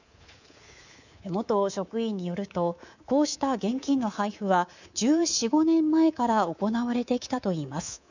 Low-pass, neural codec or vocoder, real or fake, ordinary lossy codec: 7.2 kHz; codec, 24 kHz, 3.1 kbps, DualCodec; fake; none